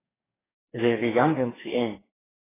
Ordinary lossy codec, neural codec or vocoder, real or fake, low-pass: AAC, 16 kbps; codec, 44.1 kHz, 2.6 kbps, DAC; fake; 3.6 kHz